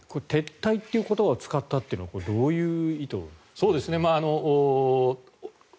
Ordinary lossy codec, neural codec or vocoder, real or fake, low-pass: none; none; real; none